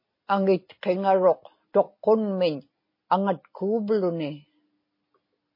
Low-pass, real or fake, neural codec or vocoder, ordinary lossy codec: 5.4 kHz; real; none; MP3, 24 kbps